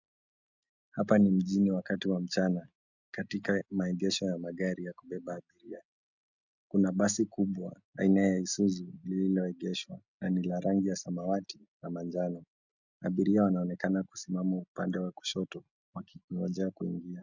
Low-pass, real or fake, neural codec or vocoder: 7.2 kHz; real; none